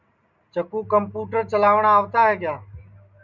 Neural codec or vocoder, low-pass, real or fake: none; 7.2 kHz; real